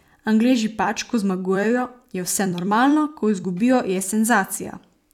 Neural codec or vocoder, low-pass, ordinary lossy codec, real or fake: vocoder, 44.1 kHz, 128 mel bands every 256 samples, BigVGAN v2; 19.8 kHz; none; fake